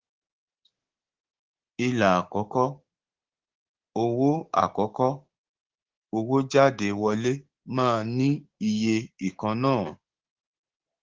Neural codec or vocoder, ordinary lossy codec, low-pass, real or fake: codec, 16 kHz, 6 kbps, DAC; Opus, 16 kbps; 7.2 kHz; fake